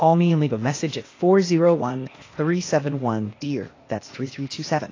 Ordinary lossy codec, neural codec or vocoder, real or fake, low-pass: AAC, 32 kbps; codec, 16 kHz, 0.8 kbps, ZipCodec; fake; 7.2 kHz